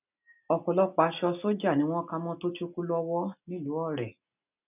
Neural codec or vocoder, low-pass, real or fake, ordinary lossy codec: none; 3.6 kHz; real; none